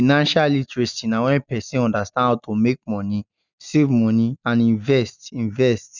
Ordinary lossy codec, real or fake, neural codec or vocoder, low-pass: none; real; none; 7.2 kHz